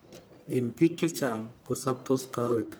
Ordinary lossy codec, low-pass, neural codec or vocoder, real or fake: none; none; codec, 44.1 kHz, 1.7 kbps, Pupu-Codec; fake